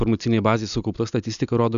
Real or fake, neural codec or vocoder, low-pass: real; none; 7.2 kHz